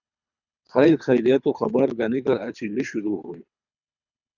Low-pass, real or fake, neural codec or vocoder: 7.2 kHz; fake; codec, 24 kHz, 3 kbps, HILCodec